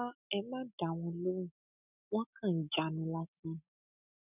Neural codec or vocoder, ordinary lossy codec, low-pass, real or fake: none; none; 3.6 kHz; real